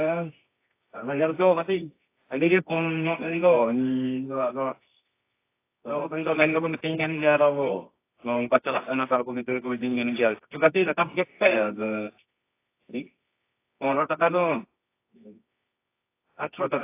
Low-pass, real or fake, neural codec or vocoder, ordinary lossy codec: 3.6 kHz; fake; codec, 24 kHz, 0.9 kbps, WavTokenizer, medium music audio release; AAC, 24 kbps